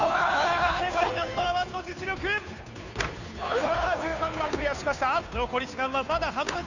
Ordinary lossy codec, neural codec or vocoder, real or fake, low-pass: none; codec, 16 kHz, 2 kbps, FunCodec, trained on Chinese and English, 25 frames a second; fake; 7.2 kHz